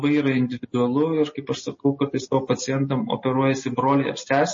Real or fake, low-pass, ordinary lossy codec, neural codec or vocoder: real; 7.2 kHz; MP3, 32 kbps; none